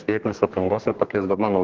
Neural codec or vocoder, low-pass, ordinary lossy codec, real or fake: codec, 32 kHz, 1.9 kbps, SNAC; 7.2 kHz; Opus, 16 kbps; fake